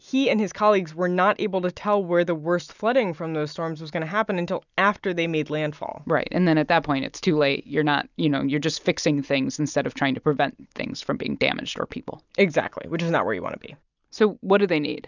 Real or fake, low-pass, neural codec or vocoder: real; 7.2 kHz; none